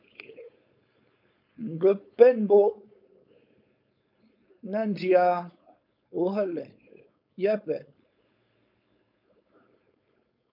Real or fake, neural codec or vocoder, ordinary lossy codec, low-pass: fake; codec, 16 kHz, 4.8 kbps, FACodec; MP3, 48 kbps; 5.4 kHz